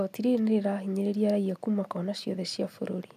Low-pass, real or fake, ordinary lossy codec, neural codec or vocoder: 14.4 kHz; real; AAC, 96 kbps; none